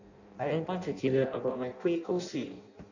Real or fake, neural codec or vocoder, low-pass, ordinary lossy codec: fake; codec, 16 kHz in and 24 kHz out, 0.6 kbps, FireRedTTS-2 codec; 7.2 kHz; none